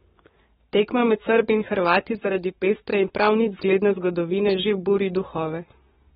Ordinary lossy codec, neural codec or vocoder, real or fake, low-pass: AAC, 16 kbps; codec, 44.1 kHz, 7.8 kbps, Pupu-Codec; fake; 19.8 kHz